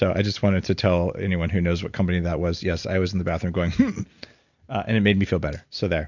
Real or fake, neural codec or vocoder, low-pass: real; none; 7.2 kHz